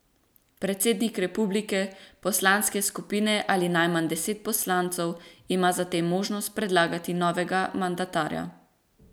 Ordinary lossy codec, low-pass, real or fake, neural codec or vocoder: none; none; real; none